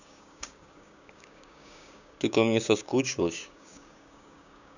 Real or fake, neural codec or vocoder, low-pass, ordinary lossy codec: fake; codec, 16 kHz, 6 kbps, DAC; 7.2 kHz; none